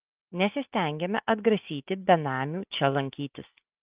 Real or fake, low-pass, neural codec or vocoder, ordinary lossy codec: real; 3.6 kHz; none; Opus, 24 kbps